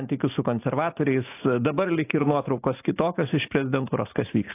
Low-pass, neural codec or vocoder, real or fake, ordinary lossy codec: 3.6 kHz; none; real; AAC, 24 kbps